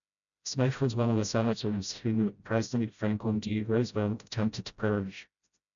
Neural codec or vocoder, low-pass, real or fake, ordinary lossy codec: codec, 16 kHz, 0.5 kbps, FreqCodec, smaller model; 7.2 kHz; fake; MP3, 96 kbps